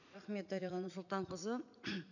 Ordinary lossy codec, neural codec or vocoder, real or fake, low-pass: none; vocoder, 44.1 kHz, 80 mel bands, Vocos; fake; 7.2 kHz